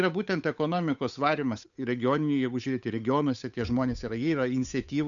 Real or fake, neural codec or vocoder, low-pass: fake; codec, 16 kHz, 8 kbps, FunCodec, trained on Chinese and English, 25 frames a second; 7.2 kHz